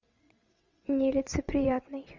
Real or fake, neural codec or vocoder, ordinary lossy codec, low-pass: fake; vocoder, 22.05 kHz, 80 mel bands, WaveNeXt; Opus, 64 kbps; 7.2 kHz